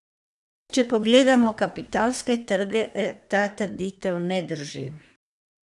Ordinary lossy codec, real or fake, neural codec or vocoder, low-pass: none; fake; codec, 24 kHz, 1 kbps, SNAC; 10.8 kHz